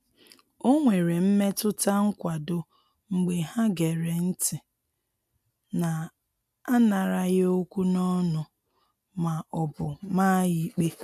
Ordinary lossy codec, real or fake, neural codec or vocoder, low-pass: none; real; none; 14.4 kHz